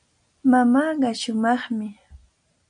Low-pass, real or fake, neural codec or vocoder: 9.9 kHz; real; none